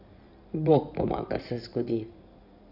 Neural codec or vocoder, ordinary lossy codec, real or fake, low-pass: codec, 16 kHz in and 24 kHz out, 2.2 kbps, FireRedTTS-2 codec; none; fake; 5.4 kHz